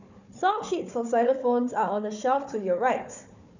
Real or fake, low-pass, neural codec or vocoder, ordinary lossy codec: fake; 7.2 kHz; codec, 16 kHz, 4 kbps, FunCodec, trained on Chinese and English, 50 frames a second; none